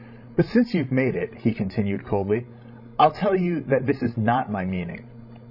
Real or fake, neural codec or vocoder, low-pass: fake; codec, 16 kHz, 16 kbps, FreqCodec, larger model; 5.4 kHz